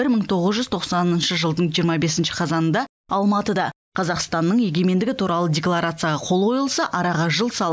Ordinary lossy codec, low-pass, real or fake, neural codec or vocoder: none; none; real; none